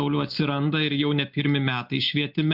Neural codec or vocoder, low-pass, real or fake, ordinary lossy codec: none; 5.4 kHz; real; MP3, 48 kbps